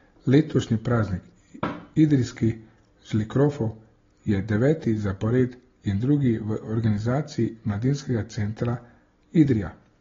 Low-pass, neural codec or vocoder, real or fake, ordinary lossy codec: 7.2 kHz; none; real; AAC, 24 kbps